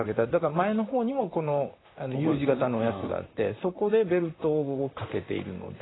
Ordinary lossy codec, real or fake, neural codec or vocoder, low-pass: AAC, 16 kbps; fake; vocoder, 44.1 kHz, 128 mel bands, Pupu-Vocoder; 7.2 kHz